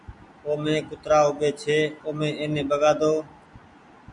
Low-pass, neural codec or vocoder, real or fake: 10.8 kHz; none; real